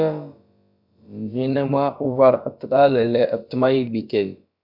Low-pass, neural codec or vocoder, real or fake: 5.4 kHz; codec, 16 kHz, about 1 kbps, DyCAST, with the encoder's durations; fake